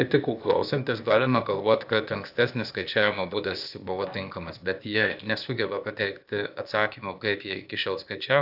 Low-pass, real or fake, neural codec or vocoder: 5.4 kHz; fake; codec, 16 kHz, 0.8 kbps, ZipCodec